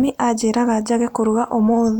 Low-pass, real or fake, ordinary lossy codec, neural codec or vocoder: 19.8 kHz; real; none; none